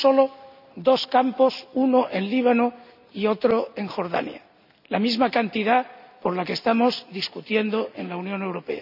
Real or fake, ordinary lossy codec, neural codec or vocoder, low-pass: real; none; none; 5.4 kHz